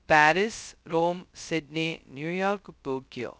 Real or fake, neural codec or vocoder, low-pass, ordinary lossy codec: fake; codec, 16 kHz, 0.2 kbps, FocalCodec; none; none